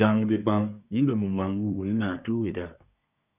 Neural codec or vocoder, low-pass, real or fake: codec, 24 kHz, 1 kbps, SNAC; 3.6 kHz; fake